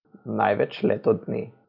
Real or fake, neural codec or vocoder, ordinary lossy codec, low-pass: real; none; none; 5.4 kHz